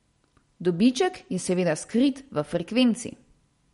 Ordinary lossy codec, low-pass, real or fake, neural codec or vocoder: MP3, 48 kbps; 19.8 kHz; real; none